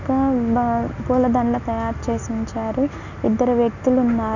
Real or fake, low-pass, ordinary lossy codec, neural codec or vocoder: real; 7.2 kHz; none; none